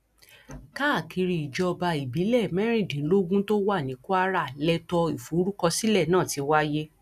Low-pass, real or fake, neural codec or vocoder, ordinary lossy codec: 14.4 kHz; real; none; none